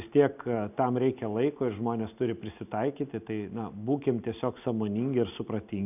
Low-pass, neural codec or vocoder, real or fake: 3.6 kHz; none; real